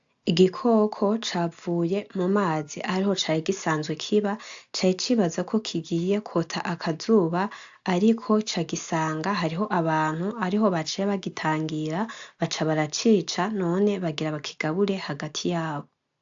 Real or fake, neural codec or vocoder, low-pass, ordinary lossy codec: real; none; 7.2 kHz; AAC, 64 kbps